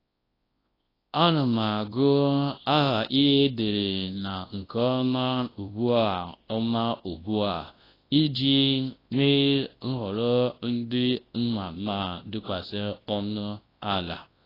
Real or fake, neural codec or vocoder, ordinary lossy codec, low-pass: fake; codec, 24 kHz, 0.9 kbps, WavTokenizer, large speech release; AAC, 24 kbps; 5.4 kHz